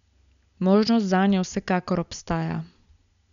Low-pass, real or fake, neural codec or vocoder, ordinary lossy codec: 7.2 kHz; real; none; none